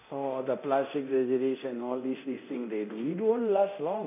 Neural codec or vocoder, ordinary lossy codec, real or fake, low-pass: codec, 24 kHz, 0.9 kbps, DualCodec; none; fake; 3.6 kHz